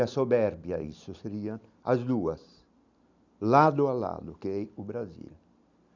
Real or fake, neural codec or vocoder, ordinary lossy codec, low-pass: real; none; none; 7.2 kHz